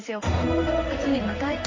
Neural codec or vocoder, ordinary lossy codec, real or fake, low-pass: codec, 16 kHz in and 24 kHz out, 1 kbps, XY-Tokenizer; MP3, 32 kbps; fake; 7.2 kHz